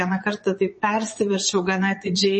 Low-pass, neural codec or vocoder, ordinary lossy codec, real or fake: 9.9 kHz; vocoder, 22.05 kHz, 80 mel bands, Vocos; MP3, 32 kbps; fake